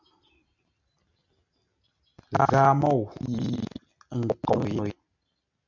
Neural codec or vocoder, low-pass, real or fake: none; 7.2 kHz; real